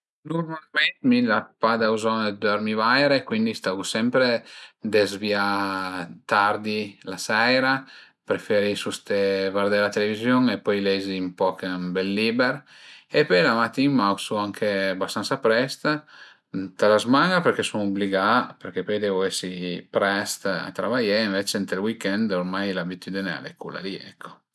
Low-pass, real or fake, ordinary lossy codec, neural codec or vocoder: none; real; none; none